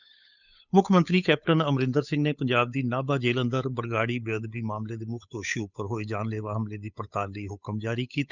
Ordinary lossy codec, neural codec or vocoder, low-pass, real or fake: none; codec, 16 kHz, 8 kbps, FunCodec, trained on Chinese and English, 25 frames a second; 7.2 kHz; fake